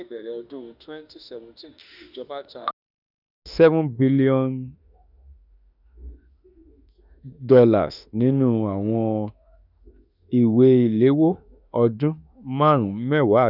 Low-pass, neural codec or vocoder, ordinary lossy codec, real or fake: 5.4 kHz; autoencoder, 48 kHz, 32 numbers a frame, DAC-VAE, trained on Japanese speech; none; fake